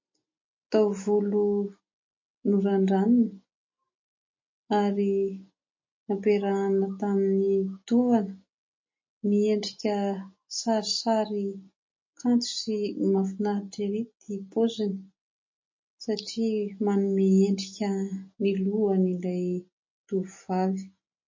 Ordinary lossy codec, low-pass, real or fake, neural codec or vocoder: MP3, 32 kbps; 7.2 kHz; real; none